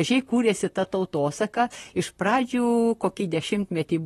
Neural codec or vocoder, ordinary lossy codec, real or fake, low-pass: vocoder, 44.1 kHz, 128 mel bands, Pupu-Vocoder; AAC, 32 kbps; fake; 19.8 kHz